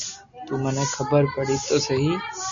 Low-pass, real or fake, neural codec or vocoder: 7.2 kHz; real; none